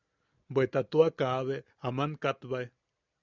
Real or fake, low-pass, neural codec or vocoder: real; 7.2 kHz; none